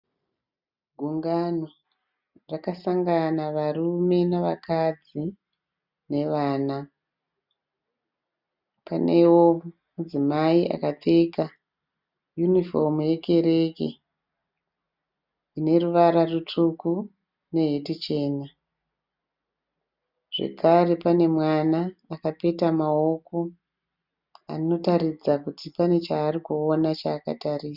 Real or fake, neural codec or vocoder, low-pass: real; none; 5.4 kHz